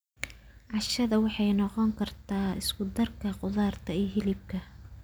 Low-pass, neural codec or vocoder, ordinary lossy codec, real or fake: none; none; none; real